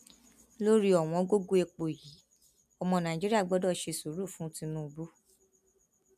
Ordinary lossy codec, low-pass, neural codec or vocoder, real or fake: none; 14.4 kHz; none; real